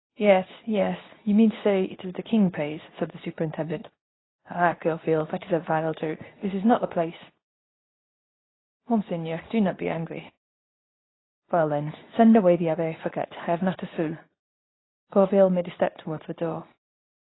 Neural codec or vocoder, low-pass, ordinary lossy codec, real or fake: codec, 24 kHz, 0.9 kbps, WavTokenizer, medium speech release version 2; 7.2 kHz; AAC, 16 kbps; fake